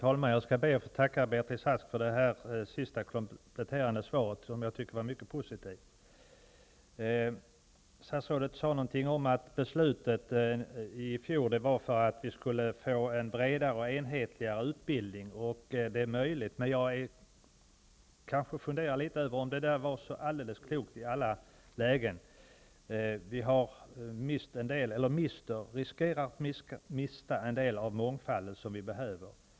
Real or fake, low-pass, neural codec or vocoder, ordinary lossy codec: real; none; none; none